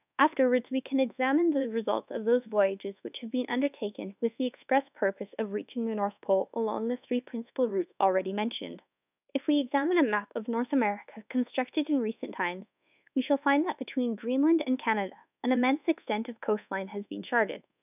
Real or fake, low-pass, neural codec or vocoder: fake; 3.6 kHz; codec, 24 kHz, 1.2 kbps, DualCodec